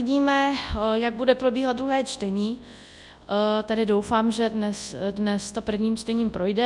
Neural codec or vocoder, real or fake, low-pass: codec, 24 kHz, 0.9 kbps, WavTokenizer, large speech release; fake; 10.8 kHz